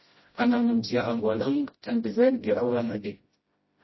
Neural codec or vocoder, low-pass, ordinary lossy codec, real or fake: codec, 16 kHz, 0.5 kbps, FreqCodec, smaller model; 7.2 kHz; MP3, 24 kbps; fake